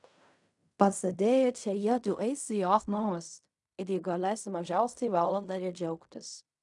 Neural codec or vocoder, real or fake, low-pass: codec, 16 kHz in and 24 kHz out, 0.4 kbps, LongCat-Audio-Codec, fine tuned four codebook decoder; fake; 10.8 kHz